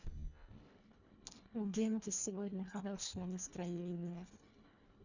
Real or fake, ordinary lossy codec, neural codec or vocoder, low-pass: fake; none; codec, 24 kHz, 1.5 kbps, HILCodec; 7.2 kHz